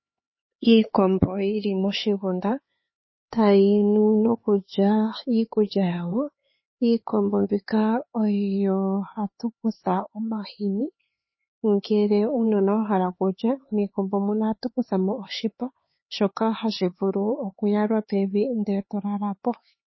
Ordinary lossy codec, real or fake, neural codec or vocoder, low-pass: MP3, 24 kbps; fake; codec, 16 kHz, 4 kbps, X-Codec, HuBERT features, trained on LibriSpeech; 7.2 kHz